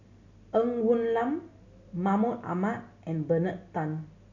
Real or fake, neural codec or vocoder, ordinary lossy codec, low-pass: real; none; none; 7.2 kHz